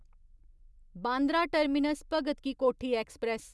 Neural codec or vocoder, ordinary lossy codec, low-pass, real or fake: none; none; none; real